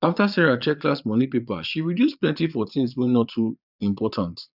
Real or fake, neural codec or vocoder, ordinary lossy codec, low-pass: fake; vocoder, 22.05 kHz, 80 mel bands, Vocos; none; 5.4 kHz